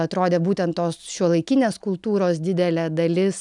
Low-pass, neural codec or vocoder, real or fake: 10.8 kHz; none; real